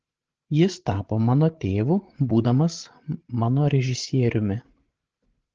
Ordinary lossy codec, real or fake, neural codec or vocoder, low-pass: Opus, 16 kbps; fake; codec, 16 kHz, 16 kbps, FreqCodec, larger model; 7.2 kHz